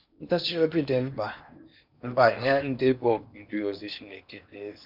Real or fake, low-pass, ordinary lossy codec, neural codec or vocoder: fake; 5.4 kHz; none; codec, 16 kHz in and 24 kHz out, 0.8 kbps, FocalCodec, streaming, 65536 codes